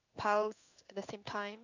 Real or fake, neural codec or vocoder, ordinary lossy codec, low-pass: fake; codec, 16 kHz in and 24 kHz out, 1 kbps, XY-Tokenizer; none; 7.2 kHz